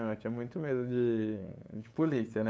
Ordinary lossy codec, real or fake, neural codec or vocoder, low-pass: none; fake; codec, 16 kHz, 8 kbps, FunCodec, trained on LibriTTS, 25 frames a second; none